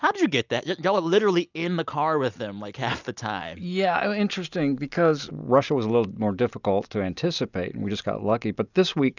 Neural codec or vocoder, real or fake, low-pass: none; real; 7.2 kHz